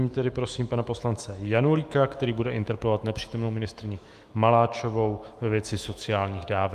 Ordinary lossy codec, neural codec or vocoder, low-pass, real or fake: Opus, 32 kbps; autoencoder, 48 kHz, 128 numbers a frame, DAC-VAE, trained on Japanese speech; 14.4 kHz; fake